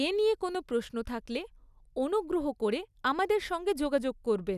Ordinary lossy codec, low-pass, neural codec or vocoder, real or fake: none; 14.4 kHz; none; real